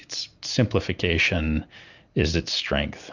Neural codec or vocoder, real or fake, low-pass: none; real; 7.2 kHz